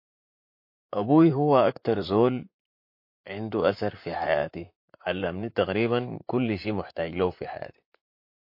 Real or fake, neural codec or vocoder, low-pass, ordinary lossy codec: fake; vocoder, 44.1 kHz, 128 mel bands, Pupu-Vocoder; 5.4 kHz; MP3, 32 kbps